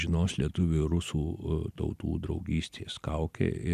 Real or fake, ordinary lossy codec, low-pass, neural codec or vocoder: real; MP3, 96 kbps; 14.4 kHz; none